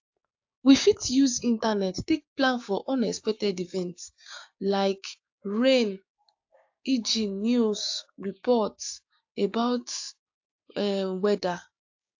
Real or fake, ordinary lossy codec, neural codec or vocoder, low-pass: fake; AAC, 48 kbps; codec, 16 kHz, 6 kbps, DAC; 7.2 kHz